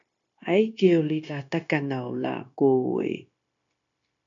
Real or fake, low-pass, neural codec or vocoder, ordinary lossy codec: fake; 7.2 kHz; codec, 16 kHz, 0.9 kbps, LongCat-Audio-Codec; MP3, 96 kbps